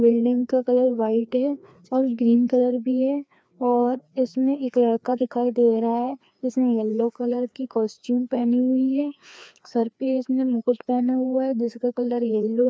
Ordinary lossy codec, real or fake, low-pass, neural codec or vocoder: none; fake; none; codec, 16 kHz, 2 kbps, FreqCodec, larger model